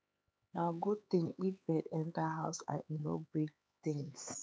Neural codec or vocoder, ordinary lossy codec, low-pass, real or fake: codec, 16 kHz, 4 kbps, X-Codec, HuBERT features, trained on LibriSpeech; none; none; fake